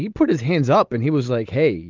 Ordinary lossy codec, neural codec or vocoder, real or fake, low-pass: Opus, 32 kbps; none; real; 7.2 kHz